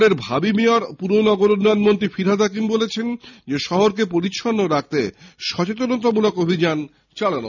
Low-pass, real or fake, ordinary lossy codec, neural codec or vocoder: 7.2 kHz; real; none; none